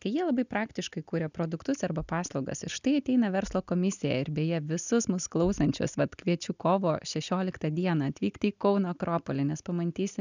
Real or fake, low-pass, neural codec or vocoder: real; 7.2 kHz; none